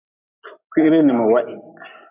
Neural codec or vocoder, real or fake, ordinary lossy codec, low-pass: none; real; AAC, 32 kbps; 3.6 kHz